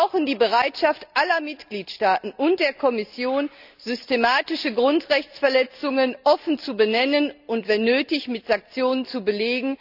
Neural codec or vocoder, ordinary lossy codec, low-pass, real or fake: none; none; 5.4 kHz; real